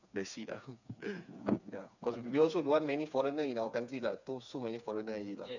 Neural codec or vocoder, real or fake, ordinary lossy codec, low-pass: codec, 16 kHz, 4 kbps, FreqCodec, smaller model; fake; none; 7.2 kHz